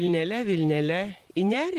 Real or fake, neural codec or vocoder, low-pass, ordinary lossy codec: fake; vocoder, 44.1 kHz, 128 mel bands, Pupu-Vocoder; 14.4 kHz; Opus, 24 kbps